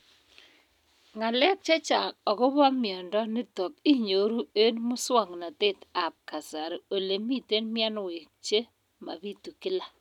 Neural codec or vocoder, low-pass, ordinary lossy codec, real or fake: none; 19.8 kHz; none; real